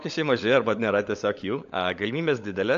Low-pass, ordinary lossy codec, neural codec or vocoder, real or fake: 7.2 kHz; AAC, 64 kbps; codec, 16 kHz, 16 kbps, FunCodec, trained on LibriTTS, 50 frames a second; fake